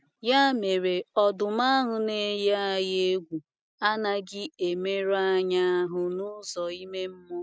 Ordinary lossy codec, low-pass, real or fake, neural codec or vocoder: none; none; real; none